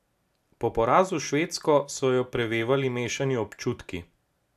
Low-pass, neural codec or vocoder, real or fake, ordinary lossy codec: 14.4 kHz; none; real; AAC, 96 kbps